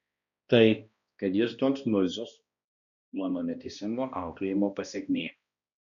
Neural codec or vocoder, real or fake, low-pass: codec, 16 kHz, 1 kbps, X-Codec, HuBERT features, trained on balanced general audio; fake; 7.2 kHz